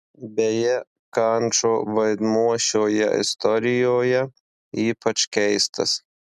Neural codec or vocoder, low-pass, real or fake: none; 14.4 kHz; real